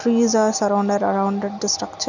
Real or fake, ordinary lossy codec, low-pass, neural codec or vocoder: real; none; 7.2 kHz; none